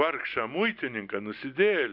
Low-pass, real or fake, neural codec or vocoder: 5.4 kHz; real; none